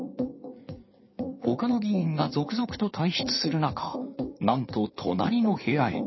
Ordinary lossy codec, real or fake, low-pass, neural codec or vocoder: MP3, 24 kbps; fake; 7.2 kHz; codec, 16 kHz in and 24 kHz out, 2.2 kbps, FireRedTTS-2 codec